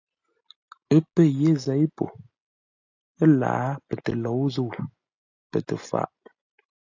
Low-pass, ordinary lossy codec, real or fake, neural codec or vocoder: 7.2 kHz; AAC, 48 kbps; real; none